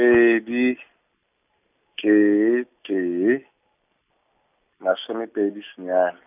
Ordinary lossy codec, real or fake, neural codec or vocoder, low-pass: none; real; none; 3.6 kHz